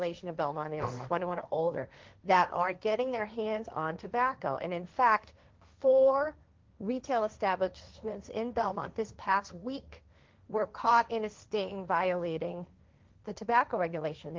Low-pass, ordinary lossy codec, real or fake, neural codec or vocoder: 7.2 kHz; Opus, 32 kbps; fake; codec, 16 kHz, 1.1 kbps, Voila-Tokenizer